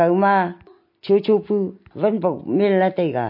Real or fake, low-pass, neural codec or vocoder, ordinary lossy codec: real; 5.4 kHz; none; MP3, 32 kbps